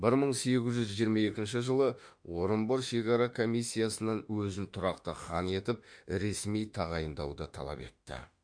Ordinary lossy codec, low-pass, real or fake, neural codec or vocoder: MP3, 64 kbps; 9.9 kHz; fake; autoencoder, 48 kHz, 32 numbers a frame, DAC-VAE, trained on Japanese speech